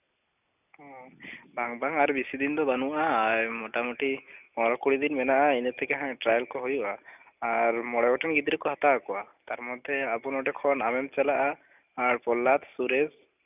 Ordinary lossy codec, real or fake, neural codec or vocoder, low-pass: none; real; none; 3.6 kHz